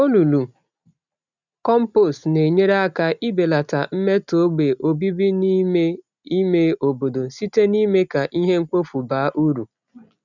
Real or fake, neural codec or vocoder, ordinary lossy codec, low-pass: real; none; none; 7.2 kHz